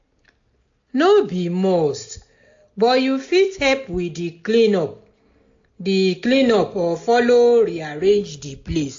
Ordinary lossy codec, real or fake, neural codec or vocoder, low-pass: AAC, 48 kbps; real; none; 7.2 kHz